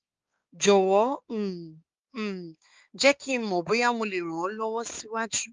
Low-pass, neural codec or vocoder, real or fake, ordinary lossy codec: 7.2 kHz; codec, 16 kHz, 4 kbps, X-Codec, HuBERT features, trained on balanced general audio; fake; Opus, 32 kbps